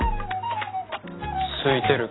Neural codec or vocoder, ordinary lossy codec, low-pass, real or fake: none; AAC, 16 kbps; 7.2 kHz; real